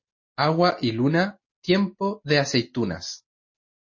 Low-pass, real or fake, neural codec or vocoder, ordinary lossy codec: 7.2 kHz; real; none; MP3, 32 kbps